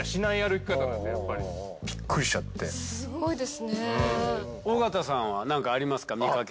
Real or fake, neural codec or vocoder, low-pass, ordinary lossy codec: real; none; none; none